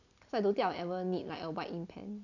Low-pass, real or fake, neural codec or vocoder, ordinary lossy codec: 7.2 kHz; real; none; none